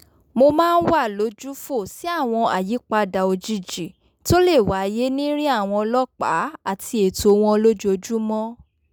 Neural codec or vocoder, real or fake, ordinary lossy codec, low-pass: none; real; none; none